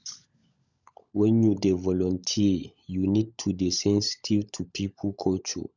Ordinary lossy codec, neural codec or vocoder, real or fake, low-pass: none; codec, 16 kHz, 16 kbps, FunCodec, trained on LibriTTS, 50 frames a second; fake; 7.2 kHz